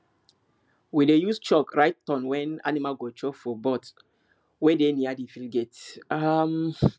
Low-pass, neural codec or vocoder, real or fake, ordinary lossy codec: none; none; real; none